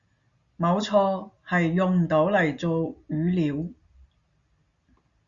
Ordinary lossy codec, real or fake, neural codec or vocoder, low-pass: Opus, 64 kbps; real; none; 7.2 kHz